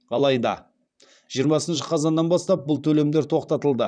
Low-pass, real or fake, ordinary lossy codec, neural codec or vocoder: 9.9 kHz; fake; none; vocoder, 44.1 kHz, 128 mel bands, Pupu-Vocoder